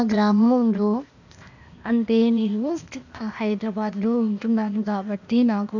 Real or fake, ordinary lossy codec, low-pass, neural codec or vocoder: fake; none; 7.2 kHz; codec, 16 kHz, 0.8 kbps, ZipCodec